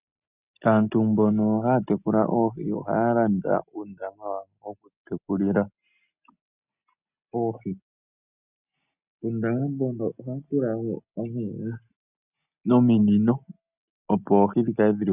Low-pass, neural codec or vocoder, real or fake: 3.6 kHz; none; real